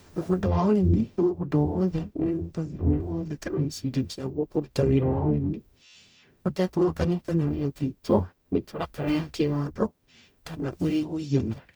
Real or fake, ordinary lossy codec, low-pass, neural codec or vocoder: fake; none; none; codec, 44.1 kHz, 0.9 kbps, DAC